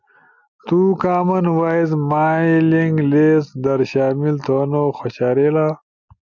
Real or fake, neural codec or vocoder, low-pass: real; none; 7.2 kHz